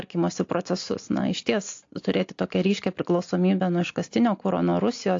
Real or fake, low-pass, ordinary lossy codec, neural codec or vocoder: real; 7.2 kHz; AAC, 48 kbps; none